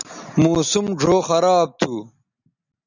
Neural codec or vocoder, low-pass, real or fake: none; 7.2 kHz; real